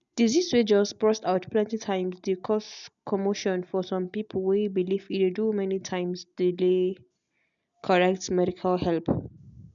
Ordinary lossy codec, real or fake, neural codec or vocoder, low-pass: none; real; none; 7.2 kHz